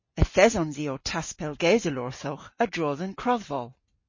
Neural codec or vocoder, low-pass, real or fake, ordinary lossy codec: none; 7.2 kHz; real; MP3, 32 kbps